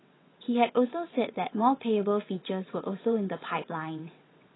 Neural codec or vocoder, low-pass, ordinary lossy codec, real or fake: vocoder, 22.05 kHz, 80 mel bands, WaveNeXt; 7.2 kHz; AAC, 16 kbps; fake